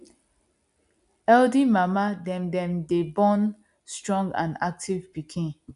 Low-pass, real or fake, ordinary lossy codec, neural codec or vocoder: 10.8 kHz; real; none; none